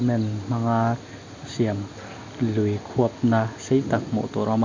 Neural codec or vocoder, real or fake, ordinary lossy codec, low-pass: none; real; MP3, 64 kbps; 7.2 kHz